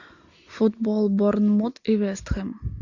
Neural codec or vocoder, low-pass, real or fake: none; 7.2 kHz; real